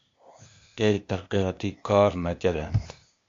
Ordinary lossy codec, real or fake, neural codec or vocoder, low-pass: MP3, 48 kbps; fake; codec, 16 kHz, 0.8 kbps, ZipCodec; 7.2 kHz